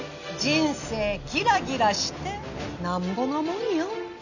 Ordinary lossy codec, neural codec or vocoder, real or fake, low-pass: none; none; real; 7.2 kHz